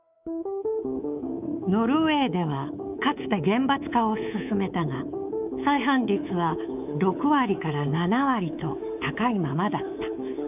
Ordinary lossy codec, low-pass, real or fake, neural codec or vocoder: none; 3.6 kHz; fake; codec, 24 kHz, 3.1 kbps, DualCodec